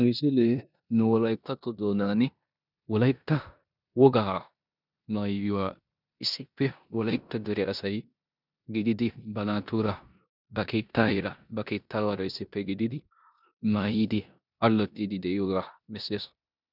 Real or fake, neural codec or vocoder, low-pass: fake; codec, 16 kHz in and 24 kHz out, 0.9 kbps, LongCat-Audio-Codec, four codebook decoder; 5.4 kHz